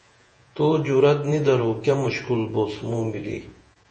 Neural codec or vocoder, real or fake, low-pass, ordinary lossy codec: vocoder, 48 kHz, 128 mel bands, Vocos; fake; 10.8 kHz; MP3, 32 kbps